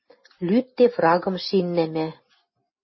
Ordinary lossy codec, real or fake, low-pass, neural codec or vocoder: MP3, 24 kbps; real; 7.2 kHz; none